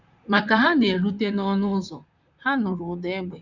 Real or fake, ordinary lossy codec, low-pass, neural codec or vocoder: fake; none; 7.2 kHz; vocoder, 44.1 kHz, 128 mel bands, Pupu-Vocoder